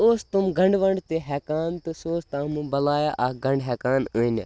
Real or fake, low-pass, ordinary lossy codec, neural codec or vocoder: real; none; none; none